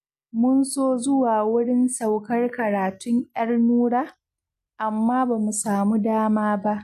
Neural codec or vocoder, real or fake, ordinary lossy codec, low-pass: none; real; MP3, 96 kbps; 14.4 kHz